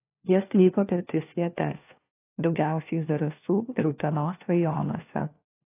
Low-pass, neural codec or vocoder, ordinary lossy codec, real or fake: 3.6 kHz; codec, 16 kHz, 1 kbps, FunCodec, trained on LibriTTS, 50 frames a second; AAC, 24 kbps; fake